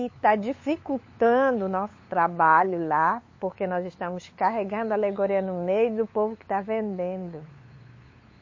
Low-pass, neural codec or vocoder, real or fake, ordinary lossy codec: 7.2 kHz; codec, 16 kHz, 8 kbps, FunCodec, trained on LibriTTS, 25 frames a second; fake; MP3, 32 kbps